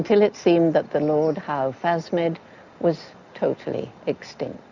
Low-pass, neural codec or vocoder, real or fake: 7.2 kHz; none; real